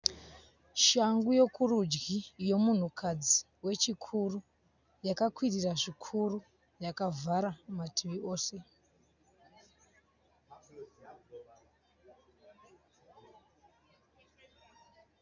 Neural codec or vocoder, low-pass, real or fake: none; 7.2 kHz; real